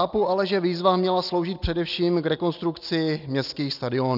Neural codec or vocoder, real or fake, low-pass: none; real; 5.4 kHz